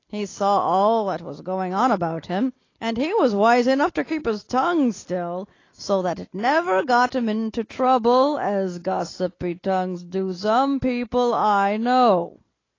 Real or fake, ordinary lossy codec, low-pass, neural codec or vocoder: real; AAC, 32 kbps; 7.2 kHz; none